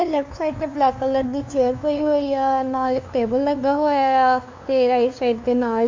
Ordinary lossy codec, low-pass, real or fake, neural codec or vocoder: MP3, 48 kbps; 7.2 kHz; fake; codec, 16 kHz, 2 kbps, FunCodec, trained on LibriTTS, 25 frames a second